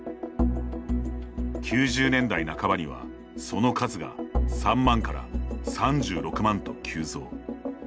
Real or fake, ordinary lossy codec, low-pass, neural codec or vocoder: real; none; none; none